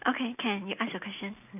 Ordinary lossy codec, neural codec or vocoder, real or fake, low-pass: none; none; real; 3.6 kHz